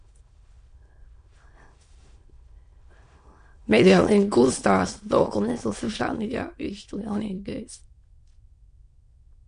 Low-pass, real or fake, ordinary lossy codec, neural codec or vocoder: 9.9 kHz; fake; MP3, 48 kbps; autoencoder, 22.05 kHz, a latent of 192 numbers a frame, VITS, trained on many speakers